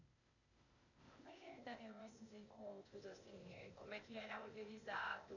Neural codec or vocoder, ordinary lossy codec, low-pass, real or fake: codec, 16 kHz, 0.8 kbps, ZipCodec; none; 7.2 kHz; fake